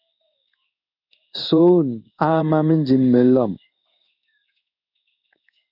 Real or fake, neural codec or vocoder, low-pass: fake; codec, 16 kHz in and 24 kHz out, 1 kbps, XY-Tokenizer; 5.4 kHz